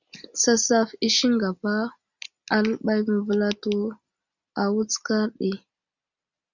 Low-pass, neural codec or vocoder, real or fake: 7.2 kHz; none; real